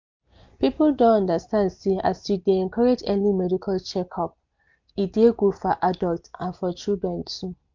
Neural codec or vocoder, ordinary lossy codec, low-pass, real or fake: none; MP3, 64 kbps; 7.2 kHz; real